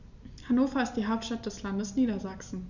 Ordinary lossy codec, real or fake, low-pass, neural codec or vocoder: none; real; 7.2 kHz; none